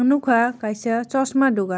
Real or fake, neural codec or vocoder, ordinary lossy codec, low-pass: real; none; none; none